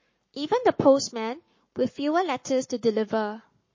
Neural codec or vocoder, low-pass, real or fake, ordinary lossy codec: codec, 44.1 kHz, 7.8 kbps, Pupu-Codec; 7.2 kHz; fake; MP3, 32 kbps